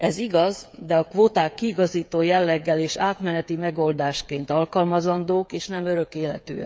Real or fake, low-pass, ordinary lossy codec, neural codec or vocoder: fake; none; none; codec, 16 kHz, 8 kbps, FreqCodec, smaller model